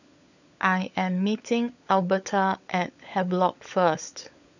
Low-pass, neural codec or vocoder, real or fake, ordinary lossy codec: 7.2 kHz; codec, 16 kHz, 16 kbps, FunCodec, trained on LibriTTS, 50 frames a second; fake; none